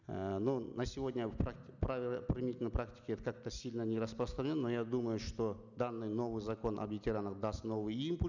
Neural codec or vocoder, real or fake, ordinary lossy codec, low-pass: none; real; none; 7.2 kHz